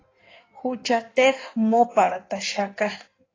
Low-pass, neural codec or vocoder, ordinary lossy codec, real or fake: 7.2 kHz; codec, 16 kHz in and 24 kHz out, 1.1 kbps, FireRedTTS-2 codec; AAC, 32 kbps; fake